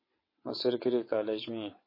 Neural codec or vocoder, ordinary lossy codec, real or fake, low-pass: vocoder, 22.05 kHz, 80 mel bands, WaveNeXt; MP3, 24 kbps; fake; 5.4 kHz